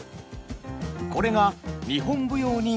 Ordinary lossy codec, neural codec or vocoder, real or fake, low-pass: none; none; real; none